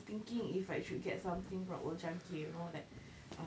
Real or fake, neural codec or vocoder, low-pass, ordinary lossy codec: real; none; none; none